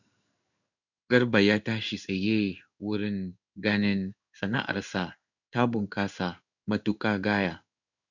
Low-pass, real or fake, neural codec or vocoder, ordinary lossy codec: 7.2 kHz; fake; codec, 16 kHz in and 24 kHz out, 1 kbps, XY-Tokenizer; none